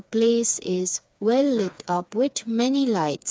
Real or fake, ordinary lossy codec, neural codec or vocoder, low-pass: fake; none; codec, 16 kHz, 4 kbps, FreqCodec, smaller model; none